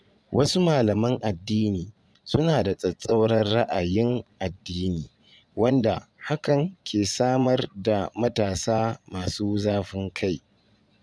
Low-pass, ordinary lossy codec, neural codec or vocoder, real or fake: none; none; vocoder, 22.05 kHz, 80 mel bands, Vocos; fake